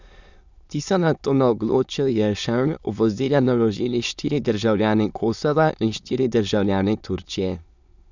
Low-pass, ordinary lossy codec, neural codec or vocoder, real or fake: 7.2 kHz; none; autoencoder, 22.05 kHz, a latent of 192 numbers a frame, VITS, trained on many speakers; fake